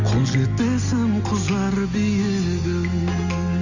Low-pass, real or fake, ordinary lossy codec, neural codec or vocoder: 7.2 kHz; real; none; none